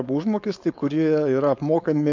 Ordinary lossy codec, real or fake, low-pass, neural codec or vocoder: MP3, 64 kbps; fake; 7.2 kHz; codec, 16 kHz, 4.8 kbps, FACodec